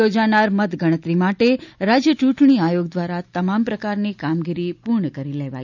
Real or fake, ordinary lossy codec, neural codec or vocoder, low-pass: real; none; none; 7.2 kHz